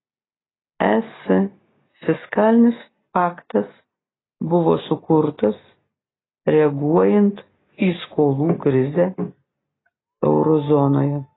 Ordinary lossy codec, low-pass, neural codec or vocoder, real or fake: AAC, 16 kbps; 7.2 kHz; none; real